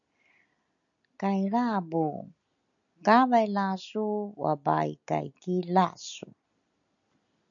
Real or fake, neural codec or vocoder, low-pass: real; none; 7.2 kHz